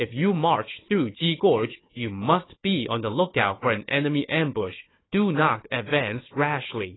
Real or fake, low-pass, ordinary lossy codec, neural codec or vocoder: fake; 7.2 kHz; AAC, 16 kbps; codec, 16 kHz, 4 kbps, FunCodec, trained on Chinese and English, 50 frames a second